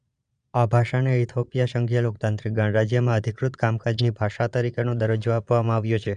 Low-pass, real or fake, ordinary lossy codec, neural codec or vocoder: 9.9 kHz; fake; none; vocoder, 22.05 kHz, 80 mel bands, Vocos